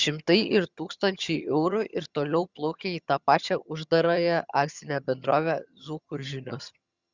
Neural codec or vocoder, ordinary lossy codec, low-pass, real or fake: vocoder, 22.05 kHz, 80 mel bands, HiFi-GAN; Opus, 64 kbps; 7.2 kHz; fake